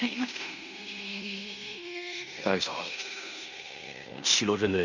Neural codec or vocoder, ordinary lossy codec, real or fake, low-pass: codec, 16 kHz in and 24 kHz out, 0.9 kbps, LongCat-Audio-Codec, fine tuned four codebook decoder; none; fake; 7.2 kHz